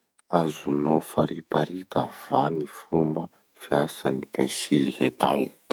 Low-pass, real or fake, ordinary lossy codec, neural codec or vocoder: none; fake; none; codec, 44.1 kHz, 2.6 kbps, SNAC